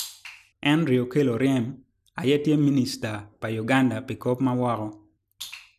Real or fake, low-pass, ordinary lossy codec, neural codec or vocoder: real; 14.4 kHz; none; none